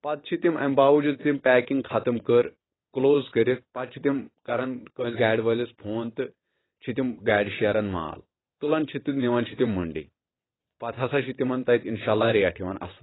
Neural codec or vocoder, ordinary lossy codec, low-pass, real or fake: vocoder, 22.05 kHz, 80 mel bands, WaveNeXt; AAC, 16 kbps; 7.2 kHz; fake